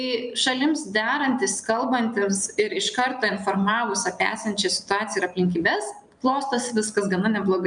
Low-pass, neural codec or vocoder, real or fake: 9.9 kHz; none; real